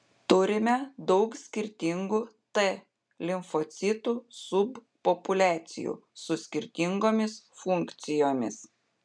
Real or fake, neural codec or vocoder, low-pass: real; none; 9.9 kHz